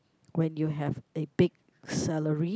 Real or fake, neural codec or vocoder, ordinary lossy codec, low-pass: real; none; none; none